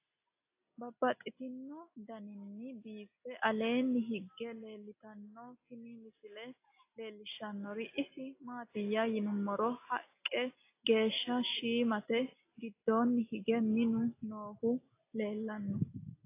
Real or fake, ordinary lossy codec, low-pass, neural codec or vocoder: real; AAC, 24 kbps; 3.6 kHz; none